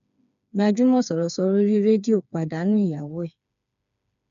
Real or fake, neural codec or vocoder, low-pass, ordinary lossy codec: fake; codec, 16 kHz, 4 kbps, FreqCodec, smaller model; 7.2 kHz; none